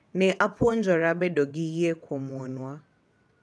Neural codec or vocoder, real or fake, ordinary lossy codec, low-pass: vocoder, 22.05 kHz, 80 mel bands, WaveNeXt; fake; none; none